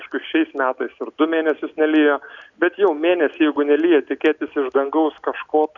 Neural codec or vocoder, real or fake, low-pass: none; real; 7.2 kHz